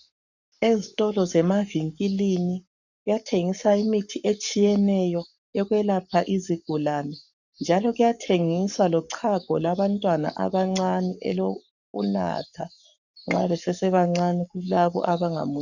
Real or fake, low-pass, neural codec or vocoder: fake; 7.2 kHz; codec, 44.1 kHz, 7.8 kbps, Pupu-Codec